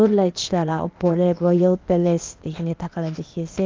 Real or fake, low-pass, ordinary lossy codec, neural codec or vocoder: fake; 7.2 kHz; Opus, 32 kbps; codec, 16 kHz, 0.8 kbps, ZipCodec